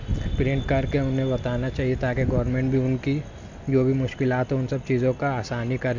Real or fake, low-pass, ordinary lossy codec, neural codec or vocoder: real; 7.2 kHz; MP3, 64 kbps; none